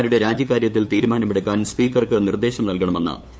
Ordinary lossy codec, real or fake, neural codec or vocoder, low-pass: none; fake; codec, 16 kHz, 8 kbps, FunCodec, trained on LibriTTS, 25 frames a second; none